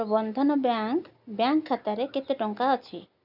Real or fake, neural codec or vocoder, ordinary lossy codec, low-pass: real; none; none; 5.4 kHz